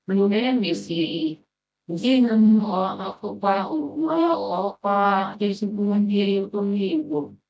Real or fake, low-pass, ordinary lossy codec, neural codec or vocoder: fake; none; none; codec, 16 kHz, 0.5 kbps, FreqCodec, smaller model